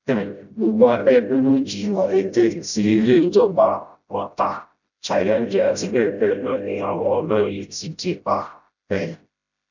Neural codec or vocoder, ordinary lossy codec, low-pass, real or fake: codec, 16 kHz, 0.5 kbps, FreqCodec, smaller model; none; 7.2 kHz; fake